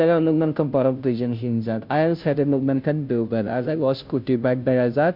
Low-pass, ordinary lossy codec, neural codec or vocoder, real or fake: 5.4 kHz; MP3, 48 kbps; codec, 16 kHz, 0.5 kbps, FunCodec, trained on Chinese and English, 25 frames a second; fake